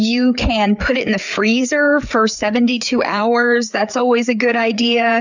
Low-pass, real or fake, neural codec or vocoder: 7.2 kHz; fake; codec, 16 kHz, 4 kbps, FreqCodec, larger model